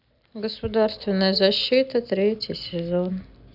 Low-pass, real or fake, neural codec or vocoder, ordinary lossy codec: 5.4 kHz; real; none; none